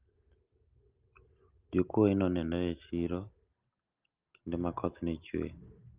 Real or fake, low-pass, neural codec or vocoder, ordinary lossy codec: real; 3.6 kHz; none; Opus, 32 kbps